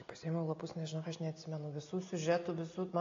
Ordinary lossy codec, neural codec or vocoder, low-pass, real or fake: AAC, 32 kbps; none; 7.2 kHz; real